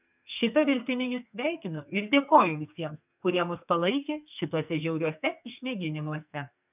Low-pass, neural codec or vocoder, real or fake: 3.6 kHz; codec, 44.1 kHz, 2.6 kbps, SNAC; fake